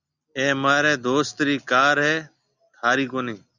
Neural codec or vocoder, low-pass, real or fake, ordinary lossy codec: none; 7.2 kHz; real; Opus, 64 kbps